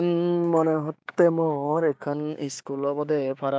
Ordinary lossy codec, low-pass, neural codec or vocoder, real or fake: none; none; codec, 16 kHz, 6 kbps, DAC; fake